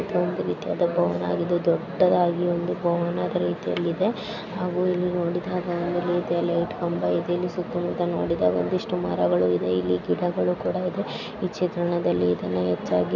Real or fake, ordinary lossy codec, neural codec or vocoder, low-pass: real; none; none; 7.2 kHz